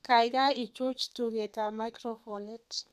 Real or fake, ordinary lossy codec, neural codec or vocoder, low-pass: fake; none; codec, 32 kHz, 1.9 kbps, SNAC; 14.4 kHz